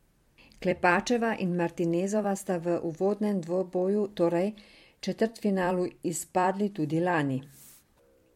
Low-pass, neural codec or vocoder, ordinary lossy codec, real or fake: 19.8 kHz; vocoder, 44.1 kHz, 128 mel bands every 256 samples, BigVGAN v2; MP3, 64 kbps; fake